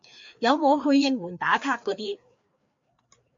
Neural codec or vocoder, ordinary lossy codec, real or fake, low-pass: codec, 16 kHz, 2 kbps, FreqCodec, larger model; MP3, 48 kbps; fake; 7.2 kHz